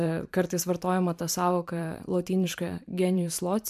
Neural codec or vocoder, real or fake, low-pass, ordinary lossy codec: vocoder, 44.1 kHz, 128 mel bands every 512 samples, BigVGAN v2; fake; 14.4 kHz; MP3, 96 kbps